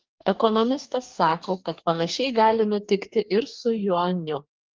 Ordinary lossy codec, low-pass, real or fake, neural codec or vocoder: Opus, 32 kbps; 7.2 kHz; fake; codec, 44.1 kHz, 2.6 kbps, DAC